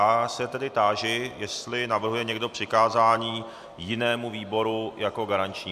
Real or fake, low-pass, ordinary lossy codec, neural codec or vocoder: real; 14.4 kHz; MP3, 96 kbps; none